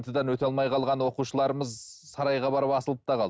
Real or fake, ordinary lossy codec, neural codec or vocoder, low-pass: real; none; none; none